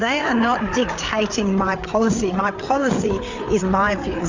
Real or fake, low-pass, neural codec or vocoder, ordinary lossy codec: fake; 7.2 kHz; codec, 16 kHz, 8 kbps, FreqCodec, larger model; MP3, 64 kbps